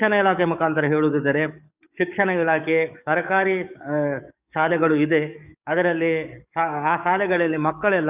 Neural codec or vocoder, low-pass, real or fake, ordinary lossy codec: codec, 44.1 kHz, 7.8 kbps, DAC; 3.6 kHz; fake; none